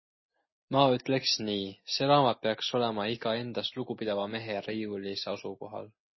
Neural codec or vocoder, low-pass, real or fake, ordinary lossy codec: none; 7.2 kHz; real; MP3, 24 kbps